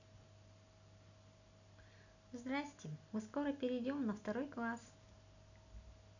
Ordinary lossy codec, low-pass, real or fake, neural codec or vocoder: none; 7.2 kHz; real; none